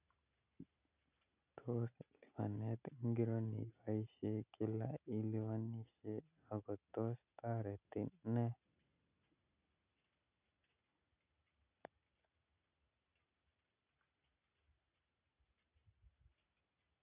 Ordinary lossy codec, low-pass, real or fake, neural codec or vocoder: none; 3.6 kHz; real; none